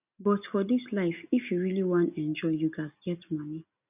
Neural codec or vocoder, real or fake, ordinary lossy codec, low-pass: none; real; none; 3.6 kHz